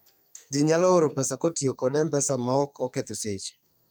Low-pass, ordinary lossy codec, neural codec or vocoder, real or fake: none; none; codec, 44.1 kHz, 2.6 kbps, SNAC; fake